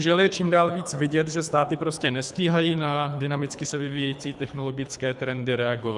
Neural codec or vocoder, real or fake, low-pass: codec, 24 kHz, 3 kbps, HILCodec; fake; 10.8 kHz